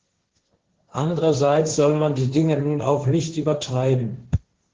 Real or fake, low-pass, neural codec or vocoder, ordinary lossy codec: fake; 7.2 kHz; codec, 16 kHz, 1.1 kbps, Voila-Tokenizer; Opus, 16 kbps